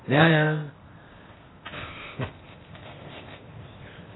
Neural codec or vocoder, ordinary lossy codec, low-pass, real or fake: codec, 16 kHz, 0.8 kbps, ZipCodec; AAC, 16 kbps; 7.2 kHz; fake